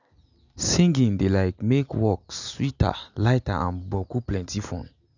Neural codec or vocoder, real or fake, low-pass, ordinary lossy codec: none; real; 7.2 kHz; none